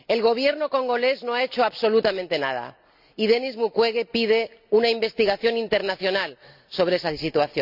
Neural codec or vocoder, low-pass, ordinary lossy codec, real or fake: none; 5.4 kHz; none; real